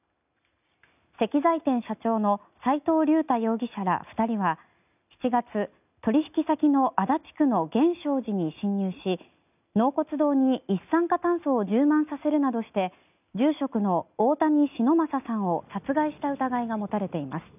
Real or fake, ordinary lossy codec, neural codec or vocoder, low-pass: real; none; none; 3.6 kHz